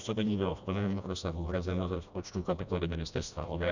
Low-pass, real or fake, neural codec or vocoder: 7.2 kHz; fake; codec, 16 kHz, 1 kbps, FreqCodec, smaller model